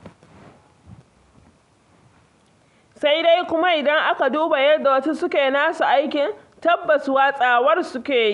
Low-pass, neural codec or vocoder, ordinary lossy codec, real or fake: 10.8 kHz; none; none; real